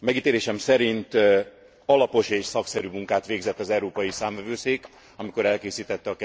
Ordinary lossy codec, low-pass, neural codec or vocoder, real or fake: none; none; none; real